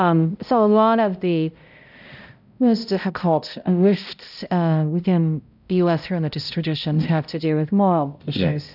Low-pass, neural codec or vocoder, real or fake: 5.4 kHz; codec, 16 kHz, 0.5 kbps, X-Codec, HuBERT features, trained on balanced general audio; fake